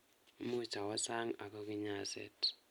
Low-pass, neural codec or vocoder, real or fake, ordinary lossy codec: none; none; real; none